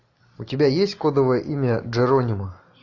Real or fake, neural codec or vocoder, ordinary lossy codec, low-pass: real; none; AAC, 48 kbps; 7.2 kHz